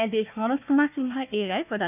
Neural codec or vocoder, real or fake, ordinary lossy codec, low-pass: codec, 16 kHz, 1 kbps, FunCodec, trained on Chinese and English, 50 frames a second; fake; none; 3.6 kHz